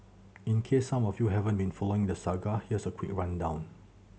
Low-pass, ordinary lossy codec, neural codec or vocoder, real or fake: none; none; none; real